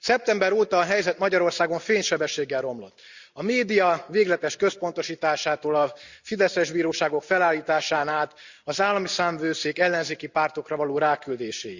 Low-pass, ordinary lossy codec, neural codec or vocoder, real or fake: 7.2 kHz; Opus, 64 kbps; none; real